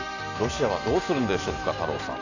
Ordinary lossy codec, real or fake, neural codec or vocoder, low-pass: none; fake; vocoder, 44.1 kHz, 128 mel bands every 512 samples, BigVGAN v2; 7.2 kHz